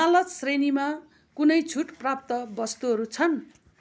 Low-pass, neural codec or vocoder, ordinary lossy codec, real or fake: none; none; none; real